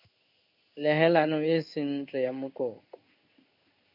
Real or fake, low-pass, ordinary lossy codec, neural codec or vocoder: fake; 5.4 kHz; MP3, 48 kbps; codec, 16 kHz in and 24 kHz out, 1 kbps, XY-Tokenizer